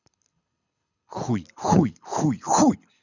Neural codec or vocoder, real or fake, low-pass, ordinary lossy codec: codec, 24 kHz, 6 kbps, HILCodec; fake; 7.2 kHz; none